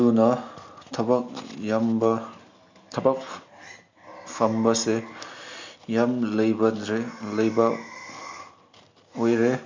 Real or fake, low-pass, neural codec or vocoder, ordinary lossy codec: real; 7.2 kHz; none; MP3, 64 kbps